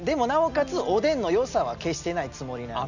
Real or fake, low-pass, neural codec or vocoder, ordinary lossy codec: real; 7.2 kHz; none; none